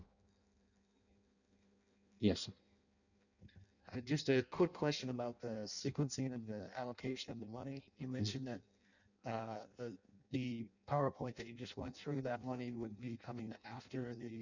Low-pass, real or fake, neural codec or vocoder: 7.2 kHz; fake; codec, 16 kHz in and 24 kHz out, 0.6 kbps, FireRedTTS-2 codec